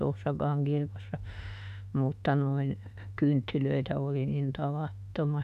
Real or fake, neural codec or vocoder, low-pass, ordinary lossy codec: fake; autoencoder, 48 kHz, 32 numbers a frame, DAC-VAE, trained on Japanese speech; 14.4 kHz; none